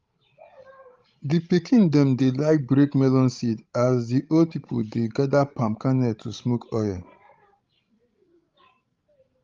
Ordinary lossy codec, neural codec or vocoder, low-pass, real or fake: Opus, 32 kbps; codec, 16 kHz, 16 kbps, FreqCodec, larger model; 7.2 kHz; fake